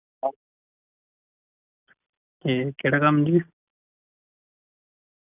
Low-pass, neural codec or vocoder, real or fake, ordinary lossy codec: 3.6 kHz; none; real; none